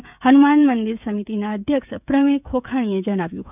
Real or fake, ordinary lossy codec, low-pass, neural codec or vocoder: fake; none; 3.6 kHz; codec, 16 kHz, 16 kbps, FreqCodec, smaller model